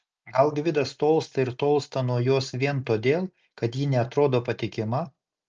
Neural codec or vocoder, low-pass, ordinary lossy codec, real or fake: none; 7.2 kHz; Opus, 24 kbps; real